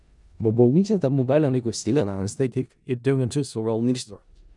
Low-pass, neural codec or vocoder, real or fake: 10.8 kHz; codec, 16 kHz in and 24 kHz out, 0.4 kbps, LongCat-Audio-Codec, four codebook decoder; fake